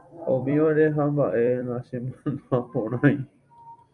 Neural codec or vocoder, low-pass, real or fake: vocoder, 44.1 kHz, 128 mel bands every 256 samples, BigVGAN v2; 10.8 kHz; fake